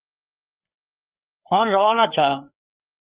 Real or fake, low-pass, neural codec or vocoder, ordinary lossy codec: fake; 3.6 kHz; codec, 16 kHz, 4 kbps, FreqCodec, larger model; Opus, 32 kbps